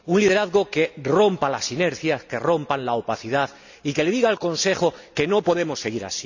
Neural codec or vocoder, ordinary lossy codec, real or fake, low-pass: none; none; real; 7.2 kHz